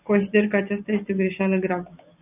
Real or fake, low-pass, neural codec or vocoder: real; 3.6 kHz; none